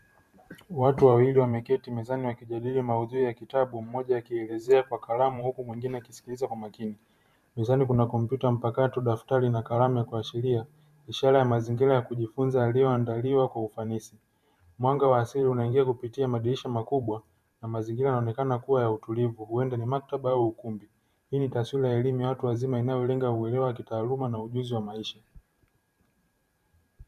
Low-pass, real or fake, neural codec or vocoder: 14.4 kHz; fake; vocoder, 44.1 kHz, 128 mel bands every 512 samples, BigVGAN v2